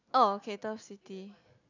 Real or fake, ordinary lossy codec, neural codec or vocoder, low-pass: real; none; none; 7.2 kHz